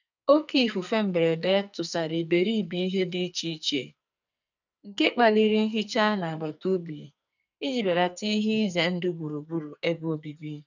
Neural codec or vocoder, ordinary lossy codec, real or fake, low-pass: codec, 44.1 kHz, 2.6 kbps, SNAC; none; fake; 7.2 kHz